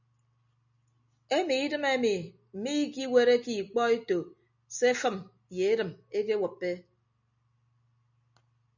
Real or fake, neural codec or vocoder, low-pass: real; none; 7.2 kHz